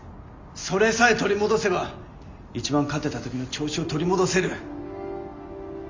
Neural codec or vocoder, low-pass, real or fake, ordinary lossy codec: none; 7.2 kHz; real; MP3, 64 kbps